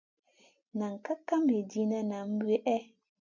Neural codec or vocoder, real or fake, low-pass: none; real; 7.2 kHz